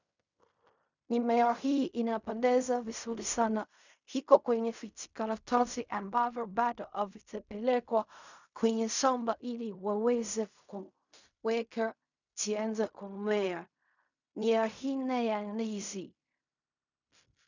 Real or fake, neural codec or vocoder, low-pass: fake; codec, 16 kHz in and 24 kHz out, 0.4 kbps, LongCat-Audio-Codec, fine tuned four codebook decoder; 7.2 kHz